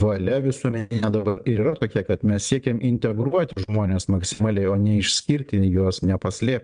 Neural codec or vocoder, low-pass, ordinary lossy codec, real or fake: vocoder, 22.05 kHz, 80 mel bands, WaveNeXt; 9.9 kHz; MP3, 96 kbps; fake